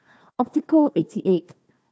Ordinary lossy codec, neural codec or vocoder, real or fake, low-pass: none; codec, 16 kHz, 1 kbps, FunCodec, trained on Chinese and English, 50 frames a second; fake; none